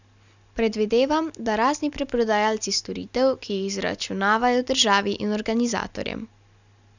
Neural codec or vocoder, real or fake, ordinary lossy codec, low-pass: none; real; none; 7.2 kHz